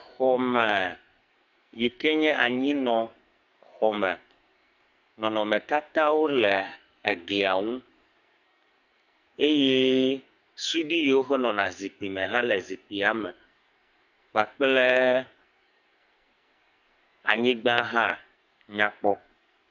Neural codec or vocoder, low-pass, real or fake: codec, 44.1 kHz, 2.6 kbps, SNAC; 7.2 kHz; fake